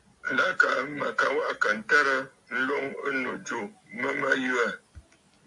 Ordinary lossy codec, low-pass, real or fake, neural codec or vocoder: AAC, 64 kbps; 10.8 kHz; real; none